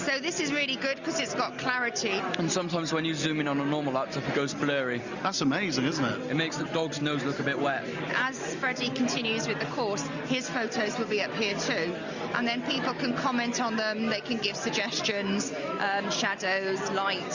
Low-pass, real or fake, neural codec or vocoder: 7.2 kHz; real; none